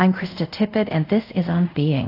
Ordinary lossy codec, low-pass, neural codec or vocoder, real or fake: AAC, 32 kbps; 5.4 kHz; codec, 24 kHz, 0.5 kbps, DualCodec; fake